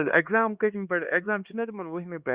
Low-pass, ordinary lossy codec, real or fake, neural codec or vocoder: 3.6 kHz; Opus, 64 kbps; fake; codec, 16 kHz, 2 kbps, X-Codec, HuBERT features, trained on LibriSpeech